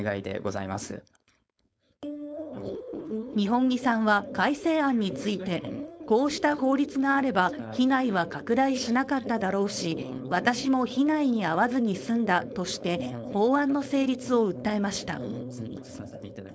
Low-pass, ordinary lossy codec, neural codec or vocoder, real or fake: none; none; codec, 16 kHz, 4.8 kbps, FACodec; fake